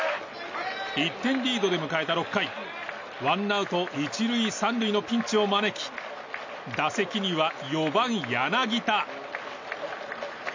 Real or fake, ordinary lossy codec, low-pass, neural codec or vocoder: real; MP3, 64 kbps; 7.2 kHz; none